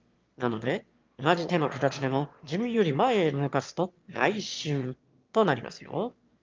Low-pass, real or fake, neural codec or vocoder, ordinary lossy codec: 7.2 kHz; fake; autoencoder, 22.05 kHz, a latent of 192 numbers a frame, VITS, trained on one speaker; Opus, 24 kbps